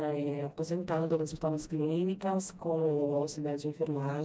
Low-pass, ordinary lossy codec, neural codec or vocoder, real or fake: none; none; codec, 16 kHz, 1 kbps, FreqCodec, smaller model; fake